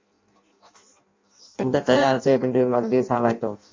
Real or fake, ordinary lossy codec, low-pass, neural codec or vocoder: fake; MP3, 48 kbps; 7.2 kHz; codec, 16 kHz in and 24 kHz out, 0.6 kbps, FireRedTTS-2 codec